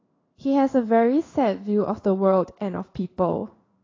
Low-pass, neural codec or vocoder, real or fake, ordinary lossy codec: 7.2 kHz; codec, 16 kHz in and 24 kHz out, 1 kbps, XY-Tokenizer; fake; AAC, 32 kbps